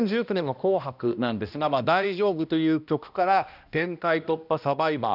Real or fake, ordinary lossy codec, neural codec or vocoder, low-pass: fake; none; codec, 16 kHz, 1 kbps, X-Codec, HuBERT features, trained on balanced general audio; 5.4 kHz